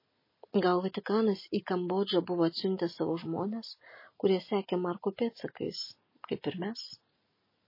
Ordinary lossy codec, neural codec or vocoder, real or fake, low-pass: MP3, 24 kbps; none; real; 5.4 kHz